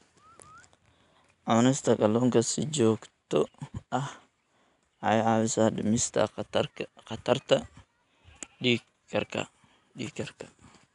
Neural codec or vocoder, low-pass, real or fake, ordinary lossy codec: none; 10.8 kHz; real; none